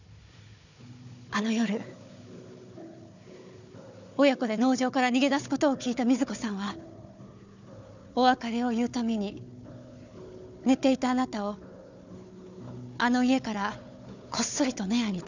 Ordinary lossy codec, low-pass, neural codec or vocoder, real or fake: none; 7.2 kHz; codec, 16 kHz, 4 kbps, FunCodec, trained on Chinese and English, 50 frames a second; fake